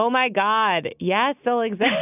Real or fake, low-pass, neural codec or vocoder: real; 3.6 kHz; none